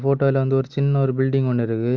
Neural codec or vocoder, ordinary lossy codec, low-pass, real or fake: none; Opus, 32 kbps; 7.2 kHz; real